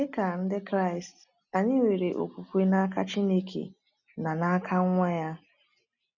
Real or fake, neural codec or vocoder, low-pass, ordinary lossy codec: real; none; 7.2 kHz; none